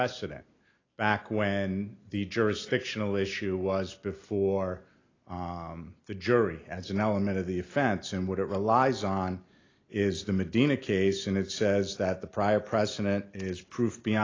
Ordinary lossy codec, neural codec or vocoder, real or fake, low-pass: AAC, 32 kbps; none; real; 7.2 kHz